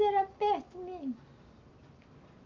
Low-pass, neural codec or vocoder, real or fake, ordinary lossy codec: 7.2 kHz; none; real; Opus, 24 kbps